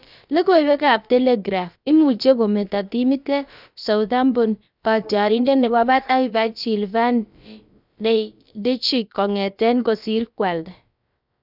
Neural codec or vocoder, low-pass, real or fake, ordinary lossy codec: codec, 16 kHz, about 1 kbps, DyCAST, with the encoder's durations; 5.4 kHz; fake; none